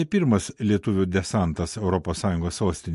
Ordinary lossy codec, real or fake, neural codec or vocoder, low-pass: MP3, 48 kbps; real; none; 14.4 kHz